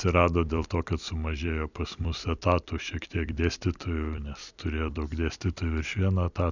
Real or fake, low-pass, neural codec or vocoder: fake; 7.2 kHz; vocoder, 44.1 kHz, 128 mel bands every 256 samples, BigVGAN v2